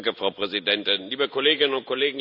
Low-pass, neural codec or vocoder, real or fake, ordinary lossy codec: 5.4 kHz; none; real; none